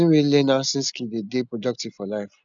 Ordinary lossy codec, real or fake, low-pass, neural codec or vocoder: none; real; 7.2 kHz; none